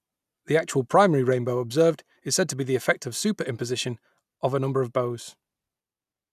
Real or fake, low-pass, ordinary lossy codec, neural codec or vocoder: real; 14.4 kHz; none; none